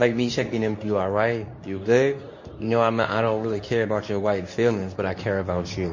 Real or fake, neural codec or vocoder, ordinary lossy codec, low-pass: fake; codec, 24 kHz, 0.9 kbps, WavTokenizer, medium speech release version 2; MP3, 32 kbps; 7.2 kHz